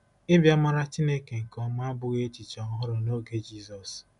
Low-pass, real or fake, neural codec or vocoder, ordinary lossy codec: 10.8 kHz; real; none; none